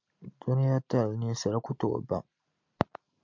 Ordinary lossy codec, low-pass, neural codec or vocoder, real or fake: MP3, 64 kbps; 7.2 kHz; none; real